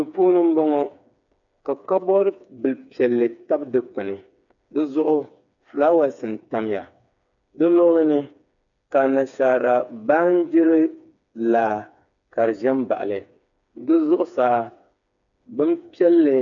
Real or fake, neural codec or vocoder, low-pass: fake; codec, 16 kHz, 4 kbps, FreqCodec, smaller model; 7.2 kHz